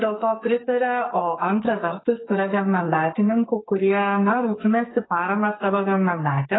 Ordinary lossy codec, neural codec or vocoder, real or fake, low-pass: AAC, 16 kbps; codec, 44.1 kHz, 2.6 kbps, SNAC; fake; 7.2 kHz